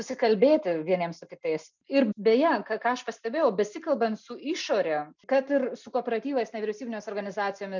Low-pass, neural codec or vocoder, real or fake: 7.2 kHz; none; real